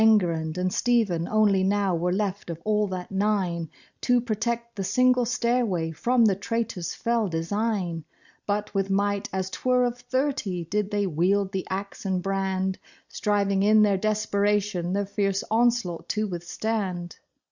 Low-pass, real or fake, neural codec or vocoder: 7.2 kHz; real; none